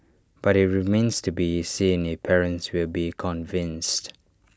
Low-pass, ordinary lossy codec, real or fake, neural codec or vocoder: none; none; real; none